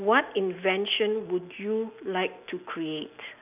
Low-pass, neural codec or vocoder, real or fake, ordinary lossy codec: 3.6 kHz; none; real; none